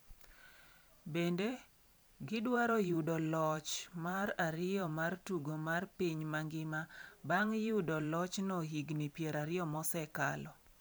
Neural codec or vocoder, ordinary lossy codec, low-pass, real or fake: vocoder, 44.1 kHz, 128 mel bands every 512 samples, BigVGAN v2; none; none; fake